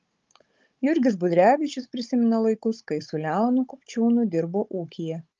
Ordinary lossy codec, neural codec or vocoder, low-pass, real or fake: Opus, 24 kbps; codec, 16 kHz, 16 kbps, FunCodec, trained on Chinese and English, 50 frames a second; 7.2 kHz; fake